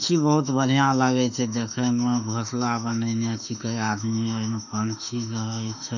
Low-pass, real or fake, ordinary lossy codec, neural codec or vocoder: 7.2 kHz; fake; none; autoencoder, 48 kHz, 32 numbers a frame, DAC-VAE, trained on Japanese speech